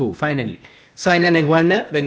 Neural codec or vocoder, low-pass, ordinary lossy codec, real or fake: codec, 16 kHz, 0.8 kbps, ZipCodec; none; none; fake